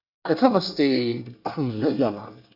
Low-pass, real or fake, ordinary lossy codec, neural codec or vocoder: 5.4 kHz; fake; none; codec, 24 kHz, 1 kbps, SNAC